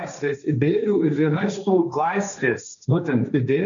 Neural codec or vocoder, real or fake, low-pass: codec, 16 kHz, 1.1 kbps, Voila-Tokenizer; fake; 7.2 kHz